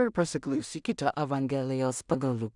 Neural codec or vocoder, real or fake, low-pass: codec, 16 kHz in and 24 kHz out, 0.4 kbps, LongCat-Audio-Codec, two codebook decoder; fake; 10.8 kHz